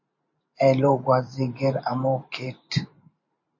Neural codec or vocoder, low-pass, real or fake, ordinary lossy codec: vocoder, 44.1 kHz, 128 mel bands every 512 samples, BigVGAN v2; 7.2 kHz; fake; MP3, 32 kbps